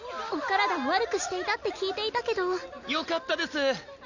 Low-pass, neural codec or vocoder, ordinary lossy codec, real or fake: 7.2 kHz; none; none; real